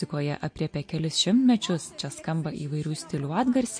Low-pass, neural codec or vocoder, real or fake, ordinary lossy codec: 9.9 kHz; none; real; MP3, 48 kbps